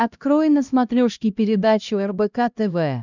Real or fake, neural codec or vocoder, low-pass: fake; codec, 16 kHz, 1 kbps, X-Codec, HuBERT features, trained on LibriSpeech; 7.2 kHz